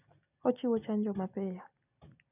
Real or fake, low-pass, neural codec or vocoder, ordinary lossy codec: real; 3.6 kHz; none; none